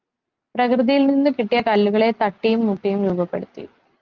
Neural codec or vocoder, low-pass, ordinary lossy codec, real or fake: none; 7.2 kHz; Opus, 32 kbps; real